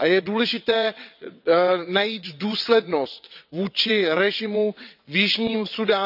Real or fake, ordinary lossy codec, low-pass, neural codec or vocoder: fake; none; 5.4 kHz; vocoder, 22.05 kHz, 80 mel bands, WaveNeXt